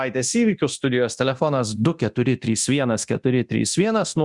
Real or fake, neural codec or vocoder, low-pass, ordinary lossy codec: fake; codec, 24 kHz, 0.9 kbps, DualCodec; 10.8 kHz; Opus, 64 kbps